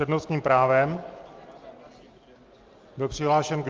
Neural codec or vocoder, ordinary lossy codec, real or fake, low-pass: none; Opus, 24 kbps; real; 7.2 kHz